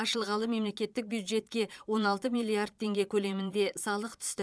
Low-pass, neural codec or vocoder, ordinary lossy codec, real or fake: none; vocoder, 22.05 kHz, 80 mel bands, Vocos; none; fake